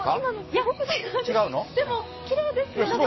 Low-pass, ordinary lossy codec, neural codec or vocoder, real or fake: 7.2 kHz; MP3, 24 kbps; codec, 44.1 kHz, 7.8 kbps, DAC; fake